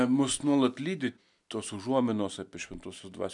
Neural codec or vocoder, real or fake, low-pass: none; real; 10.8 kHz